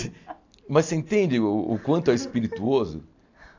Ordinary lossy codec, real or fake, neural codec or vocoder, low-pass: AAC, 48 kbps; real; none; 7.2 kHz